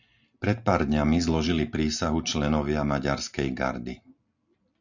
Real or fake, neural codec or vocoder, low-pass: real; none; 7.2 kHz